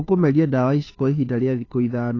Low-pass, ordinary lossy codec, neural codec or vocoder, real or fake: 7.2 kHz; AAC, 32 kbps; codec, 16 kHz, 2 kbps, FunCodec, trained on Chinese and English, 25 frames a second; fake